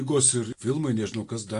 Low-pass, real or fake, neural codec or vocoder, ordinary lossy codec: 10.8 kHz; real; none; AAC, 48 kbps